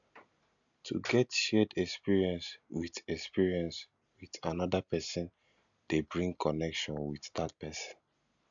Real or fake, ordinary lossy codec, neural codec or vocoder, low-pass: real; none; none; 7.2 kHz